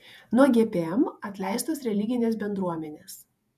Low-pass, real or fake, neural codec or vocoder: 14.4 kHz; fake; vocoder, 48 kHz, 128 mel bands, Vocos